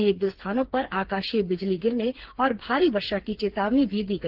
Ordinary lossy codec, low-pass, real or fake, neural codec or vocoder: Opus, 16 kbps; 5.4 kHz; fake; codec, 16 kHz, 4 kbps, FreqCodec, smaller model